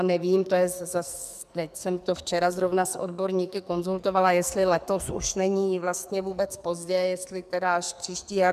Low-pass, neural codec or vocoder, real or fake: 14.4 kHz; codec, 44.1 kHz, 2.6 kbps, SNAC; fake